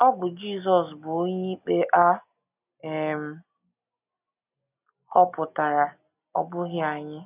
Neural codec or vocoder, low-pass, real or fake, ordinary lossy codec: none; 3.6 kHz; real; none